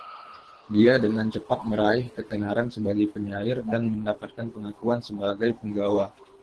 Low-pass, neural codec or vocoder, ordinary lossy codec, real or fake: 10.8 kHz; codec, 24 kHz, 3 kbps, HILCodec; Opus, 16 kbps; fake